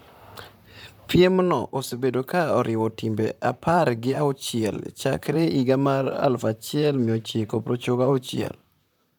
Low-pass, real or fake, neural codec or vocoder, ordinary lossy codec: none; fake; vocoder, 44.1 kHz, 128 mel bands, Pupu-Vocoder; none